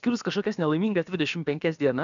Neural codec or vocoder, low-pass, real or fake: codec, 16 kHz, about 1 kbps, DyCAST, with the encoder's durations; 7.2 kHz; fake